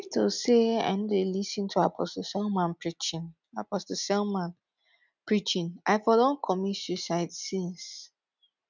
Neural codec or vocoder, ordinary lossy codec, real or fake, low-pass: none; none; real; 7.2 kHz